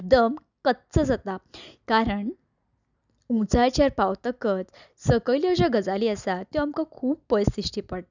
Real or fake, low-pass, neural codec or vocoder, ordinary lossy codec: real; 7.2 kHz; none; none